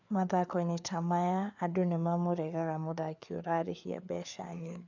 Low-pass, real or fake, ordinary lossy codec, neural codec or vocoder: 7.2 kHz; fake; none; codec, 16 kHz, 4 kbps, FreqCodec, larger model